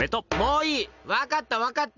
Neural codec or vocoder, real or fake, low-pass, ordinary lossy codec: none; real; 7.2 kHz; none